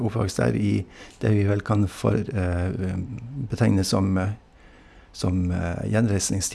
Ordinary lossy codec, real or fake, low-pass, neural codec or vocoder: none; real; none; none